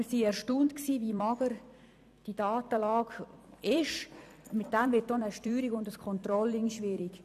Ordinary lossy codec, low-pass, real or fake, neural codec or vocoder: none; 14.4 kHz; fake; vocoder, 44.1 kHz, 128 mel bands every 256 samples, BigVGAN v2